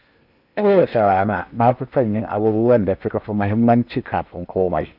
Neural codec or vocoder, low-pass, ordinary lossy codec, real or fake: codec, 16 kHz in and 24 kHz out, 0.8 kbps, FocalCodec, streaming, 65536 codes; 5.4 kHz; none; fake